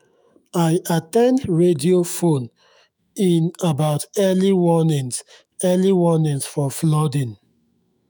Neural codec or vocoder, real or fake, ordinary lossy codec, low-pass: autoencoder, 48 kHz, 128 numbers a frame, DAC-VAE, trained on Japanese speech; fake; none; none